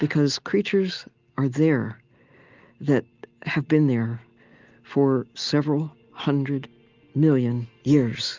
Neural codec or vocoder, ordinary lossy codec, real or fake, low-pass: none; Opus, 32 kbps; real; 7.2 kHz